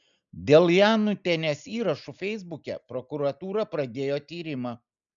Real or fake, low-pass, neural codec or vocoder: real; 7.2 kHz; none